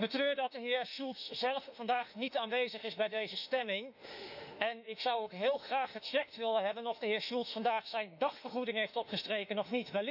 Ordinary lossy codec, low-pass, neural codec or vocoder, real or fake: none; 5.4 kHz; autoencoder, 48 kHz, 32 numbers a frame, DAC-VAE, trained on Japanese speech; fake